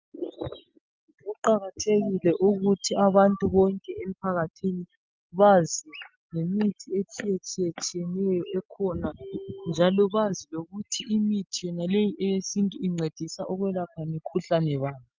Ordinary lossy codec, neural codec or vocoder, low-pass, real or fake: Opus, 24 kbps; none; 7.2 kHz; real